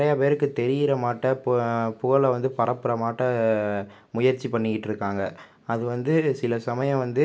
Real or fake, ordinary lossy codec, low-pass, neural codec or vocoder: real; none; none; none